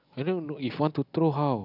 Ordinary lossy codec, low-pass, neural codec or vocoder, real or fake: none; 5.4 kHz; none; real